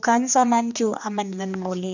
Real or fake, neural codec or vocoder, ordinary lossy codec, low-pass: fake; codec, 16 kHz, 2 kbps, X-Codec, HuBERT features, trained on general audio; none; 7.2 kHz